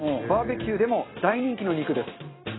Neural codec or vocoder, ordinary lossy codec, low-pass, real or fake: none; AAC, 16 kbps; 7.2 kHz; real